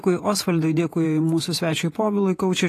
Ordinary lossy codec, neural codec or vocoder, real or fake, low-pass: AAC, 48 kbps; vocoder, 48 kHz, 128 mel bands, Vocos; fake; 14.4 kHz